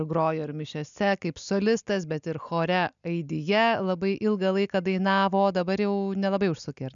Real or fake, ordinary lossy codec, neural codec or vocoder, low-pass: real; MP3, 96 kbps; none; 7.2 kHz